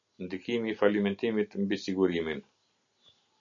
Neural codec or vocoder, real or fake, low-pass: none; real; 7.2 kHz